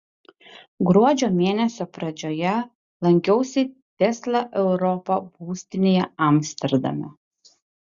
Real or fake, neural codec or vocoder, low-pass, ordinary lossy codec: real; none; 7.2 kHz; Opus, 64 kbps